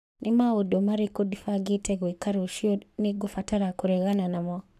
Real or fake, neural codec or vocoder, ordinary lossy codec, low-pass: fake; codec, 44.1 kHz, 7.8 kbps, Pupu-Codec; none; 14.4 kHz